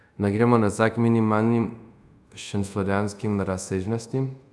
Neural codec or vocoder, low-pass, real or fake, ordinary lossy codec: codec, 24 kHz, 0.5 kbps, DualCodec; none; fake; none